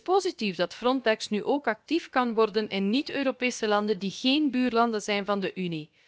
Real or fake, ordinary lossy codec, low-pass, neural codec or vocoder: fake; none; none; codec, 16 kHz, about 1 kbps, DyCAST, with the encoder's durations